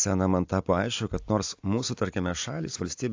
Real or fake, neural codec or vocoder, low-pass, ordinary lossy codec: real; none; 7.2 kHz; AAC, 48 kbps